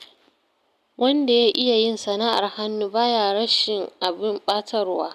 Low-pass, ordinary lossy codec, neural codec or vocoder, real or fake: 14.4 kHz; none; none; real